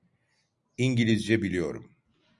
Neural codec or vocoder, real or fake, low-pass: none; real; 10.8 kHz